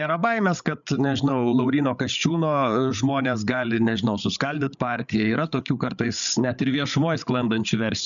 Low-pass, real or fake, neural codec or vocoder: 7.2 kHz; fake; codec, 16 kHz, 8 kbps, FreqCodec, larger model